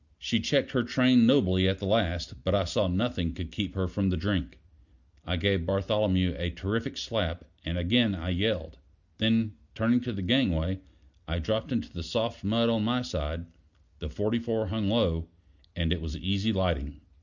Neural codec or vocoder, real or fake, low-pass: none; real; 7.2 kHz